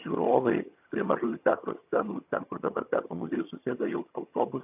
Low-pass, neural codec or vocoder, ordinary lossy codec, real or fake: 3.6 kHz; vocoder, 22.05 kHz, 80 mel bands, HiFi-GAN; AAC, 32 kbps; fake